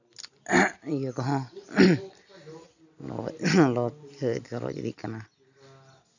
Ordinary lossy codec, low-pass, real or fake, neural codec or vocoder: none; 7.2 kHz; real; none